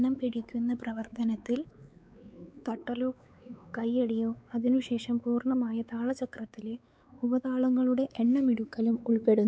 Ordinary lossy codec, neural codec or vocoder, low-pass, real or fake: none; codec, 16 kHz, 4 kbps, X-Codec, WavLM features, trained on Multilingual LibriSpeech; none; fake